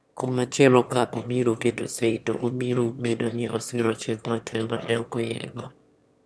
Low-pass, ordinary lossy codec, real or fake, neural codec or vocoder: none; none; fake; autoencoder, 22.05 kHz, a latent of 192 numbers a frame, VITS, trained on one speaker